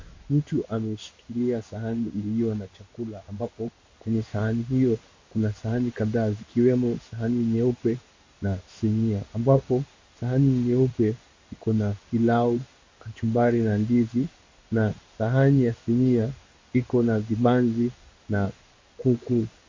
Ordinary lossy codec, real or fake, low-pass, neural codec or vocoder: MP3, 32 kbps; fake; 7.2 kHz; codec, 16 kHz in and 24 kHz out, 1 kbps, XY-Tokenizer